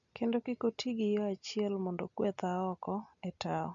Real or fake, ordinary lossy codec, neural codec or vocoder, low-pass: real; AAC, 64 kbps; none; 7.2 kHz